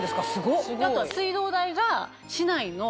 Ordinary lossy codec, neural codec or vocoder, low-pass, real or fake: none; none; none; real